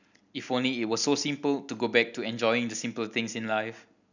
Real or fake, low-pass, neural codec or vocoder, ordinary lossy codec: real; 7.2 kHz; none; none